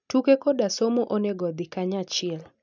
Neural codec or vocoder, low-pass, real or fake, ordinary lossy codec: none; 7.2 kHz; real; none